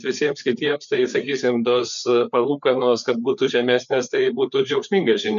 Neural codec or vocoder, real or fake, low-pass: codec, 16 kHz, 4 kbps, FreqCodec, larger model; fake; 7.2 kHz